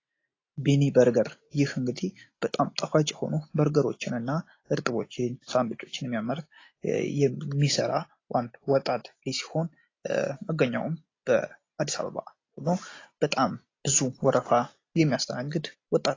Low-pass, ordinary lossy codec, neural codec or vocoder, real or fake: 7.2 kHz; AAC, 32 kbps; none; real